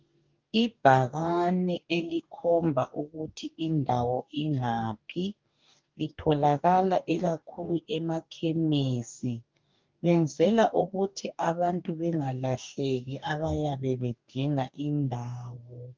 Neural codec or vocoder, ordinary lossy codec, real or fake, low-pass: codec, 44.1 kHz, 2.6 kbps, DAC; Opus, 24 kbps; fake; 7.2 kHz